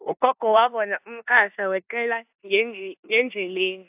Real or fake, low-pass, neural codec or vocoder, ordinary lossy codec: fake; 3.6 kHz; codec, 16 kHz in and 24 kHz out, 0.9 kbps, LongCat-Audio-Codec, four codebook decoder; AAC, 32 kbps